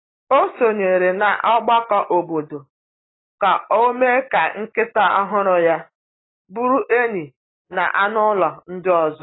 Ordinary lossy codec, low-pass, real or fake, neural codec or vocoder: AAC, 16 kbps; 7.2 kHz; real; none